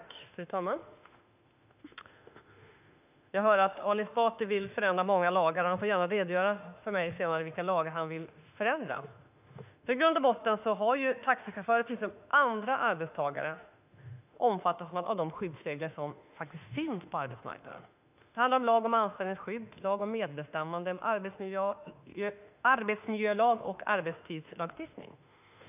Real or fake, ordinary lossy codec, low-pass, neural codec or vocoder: fake; none; 3.6 kHz; autoencoder, 48 kHz, 32 numbers a frame, DAC-VAE, trained on Japanese speech